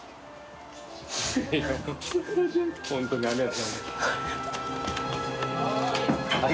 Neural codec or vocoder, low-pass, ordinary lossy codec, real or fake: none; none; none; real